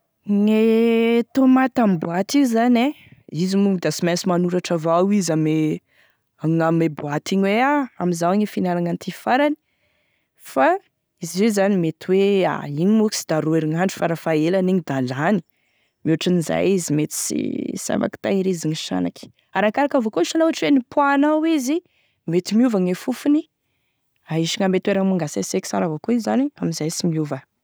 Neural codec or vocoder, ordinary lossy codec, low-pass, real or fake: none; none; none; real